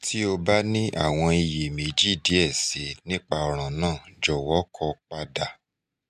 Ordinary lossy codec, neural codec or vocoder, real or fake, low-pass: MP3, 96 kbps; none; real; 14.4 kHz